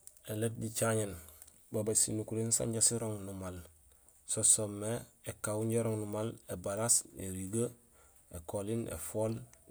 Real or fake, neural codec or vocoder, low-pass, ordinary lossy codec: real; none; none; none